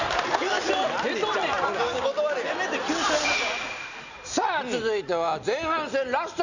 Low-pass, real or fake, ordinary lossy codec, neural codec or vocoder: 7.2 kHz; real; none; none